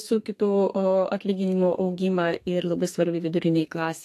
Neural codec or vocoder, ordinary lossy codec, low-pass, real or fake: codec, 32 kHz, 1.9 kbps, SNAC; AAC, 64 kbps; 14.4 kHz; fake